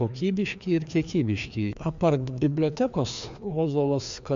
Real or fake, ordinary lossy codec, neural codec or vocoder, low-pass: fake; MP3, 64 kbps; codec, 16 kHz, 2 kbps, FreqCodec, larger model; 7.2 kHz